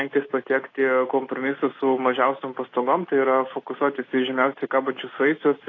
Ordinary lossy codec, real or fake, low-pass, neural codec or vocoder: AAC, 32 kbps; real; 7.2 kHz; none